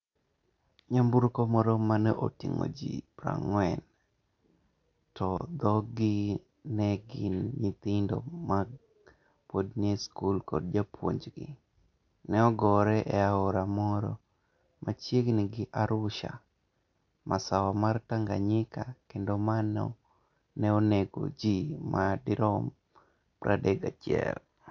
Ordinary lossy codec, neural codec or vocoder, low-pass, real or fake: AAC, 48 kbps; none; 7.2 kHz; real